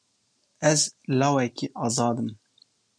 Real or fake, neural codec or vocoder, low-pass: real; none; 9.9 kHz